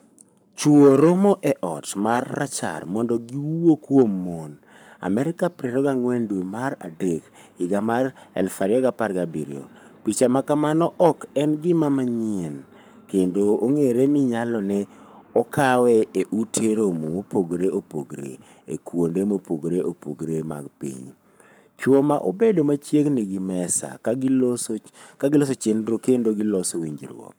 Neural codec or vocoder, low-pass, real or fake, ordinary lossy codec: codec, 44.1 kHz, 7.8 kbps, Pupu-Codec; none; fake; none